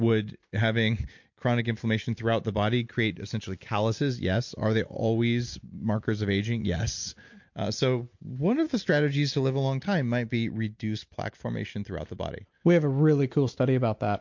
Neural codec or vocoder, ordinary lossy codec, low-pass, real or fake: none; MP3, 48 kbps; 7.2 kHz; real